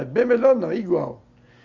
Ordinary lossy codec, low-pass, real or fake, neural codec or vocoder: Opus, 64 kbps; 7.2 kHz; real; none